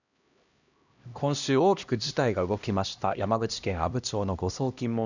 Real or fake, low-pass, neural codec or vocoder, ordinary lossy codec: fake; 7.2 kHz; codec, 16 kHz, 1 kbps, X-Codec, HuBERT features, trained on LibriSpeech; none